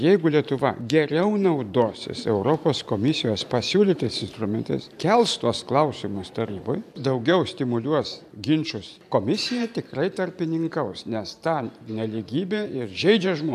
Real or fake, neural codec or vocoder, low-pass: fake; vocoder, 44.1 kHz, 128 mel bands every 512 samples, BigVGAN v2; 14.4 kHz